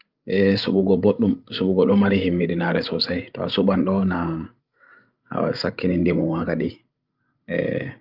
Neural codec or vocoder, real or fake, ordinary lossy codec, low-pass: codec, 16 kHz, 16 kbps, FreqCodec, larger model; fake; Opus, 24 kbps; 5.4 kHz